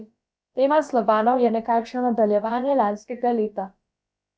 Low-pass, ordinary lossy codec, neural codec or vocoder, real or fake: none; none; codec, 16 kHz, about 1 kbps, DyCAST, with the encoder's durations; fake